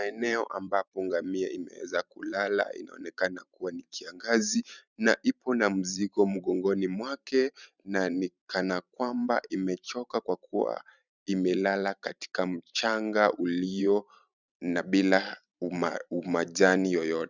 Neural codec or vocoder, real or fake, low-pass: vocoder, 44.1 kHz, 128 mel bands every 512 samples, BigVGAN v2; fake; 7.2 kHz